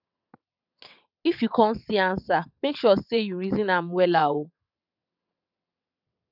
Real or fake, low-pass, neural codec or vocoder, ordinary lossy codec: fake; 5.4 kHz; vocoder, 22.05 kHz, 80 mel bands, WaveNeXt; none